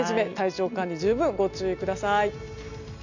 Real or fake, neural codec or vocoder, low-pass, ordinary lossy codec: real; none; 7.2 kHz; none